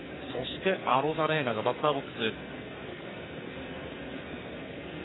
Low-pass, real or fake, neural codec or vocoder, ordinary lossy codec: 7.2 kHz; fake; codec, 44.1 kHz, 2.6 kbps, SNAC; AAC, 16 kbps